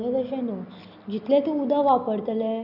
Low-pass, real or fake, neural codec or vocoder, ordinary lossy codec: 5.4 kHz; real; none; none